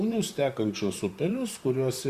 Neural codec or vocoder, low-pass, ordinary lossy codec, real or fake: vocoder, 44.1 kHz, 128 mel bands, Pupu-Vocoder; 14.4 kHz; Opus, 64 kbps; fake